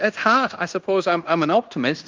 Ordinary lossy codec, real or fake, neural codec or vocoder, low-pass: Opus, 24 kbps; fake; codec, 16 kHz in and 24 kHz out, 0.9 kbps, LongCat-Audio-Codec, fine tuned four codebook decoder; 7.2 kHz